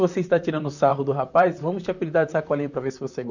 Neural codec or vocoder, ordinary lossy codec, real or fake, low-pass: vocoder, 44.1 kHz, 128 mel bands, Pupu-Vocoder; none; fake; 7.2 kHz